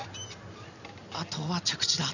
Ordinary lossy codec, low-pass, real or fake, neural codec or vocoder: none; 7.2 kHz; real; none